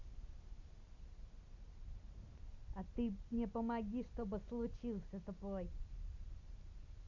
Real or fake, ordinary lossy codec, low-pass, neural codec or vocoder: real; Opus, 64 kbps; 7.2 kHz; none